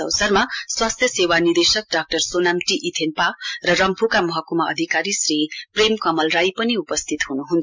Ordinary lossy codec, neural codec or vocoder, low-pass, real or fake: MP3, 64 kbps; none; 7.2 kHz; real